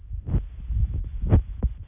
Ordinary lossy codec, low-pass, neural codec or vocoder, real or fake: none; 3.6 kHz; none; real